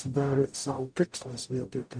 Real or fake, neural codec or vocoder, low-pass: fake; codec, 44.1 kHz, 0.9 kbps, DAC; 9.9 kHz